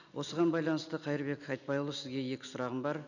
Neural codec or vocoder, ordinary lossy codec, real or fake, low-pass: none; none; real; 7.2 kHz